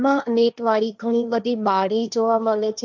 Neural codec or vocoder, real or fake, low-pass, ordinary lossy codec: codec, 16 kHz, 1.1 kbps, Voila-Tokenizer; fake; none; none